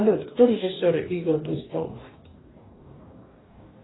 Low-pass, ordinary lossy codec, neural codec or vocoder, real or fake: 7.2 kHz; AAC, 16 kbps; codec, 44.1 kHz, 2.6 kbps, DAC; fake